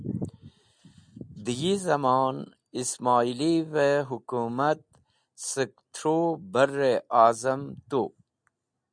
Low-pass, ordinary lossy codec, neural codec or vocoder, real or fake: 9.9 kHz; MP3, 96 kbps; none; real